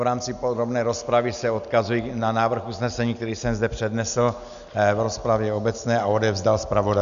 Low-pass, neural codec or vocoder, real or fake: 7.2 kHz; none; real